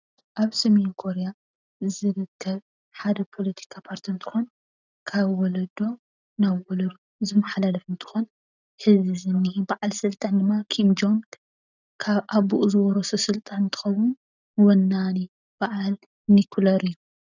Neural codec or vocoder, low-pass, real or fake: none; 7.2 kHz; real